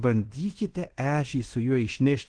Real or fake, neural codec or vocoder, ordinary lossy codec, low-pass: fake; codec, 16 kHz in and 24 kHz out, 0.9 kbps, LongCat-Audio-Codec, fine tuned four codebook decoder; Opus, 16 kbps; 9.9 kHz